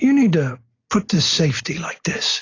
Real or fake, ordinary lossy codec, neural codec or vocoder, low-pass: real; AAC, 32 kbps; none; 7.2 kHz